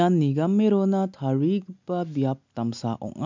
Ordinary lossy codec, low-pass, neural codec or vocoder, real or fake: MP3, 64 kbps; 7.2 kHz; none; real